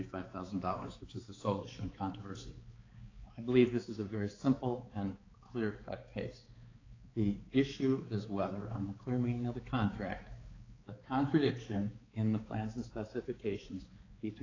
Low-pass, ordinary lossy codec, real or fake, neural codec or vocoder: 7.2 kHz; AAC, 32 kbps; fake; codec, 16 kHz, 4 kbps, X-Codec, HuBERT features, trained on balanced general audio